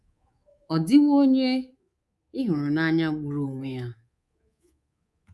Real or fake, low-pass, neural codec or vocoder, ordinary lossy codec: fake; none; codec, 24 kHz, 3.1 kbps, DualCodec; none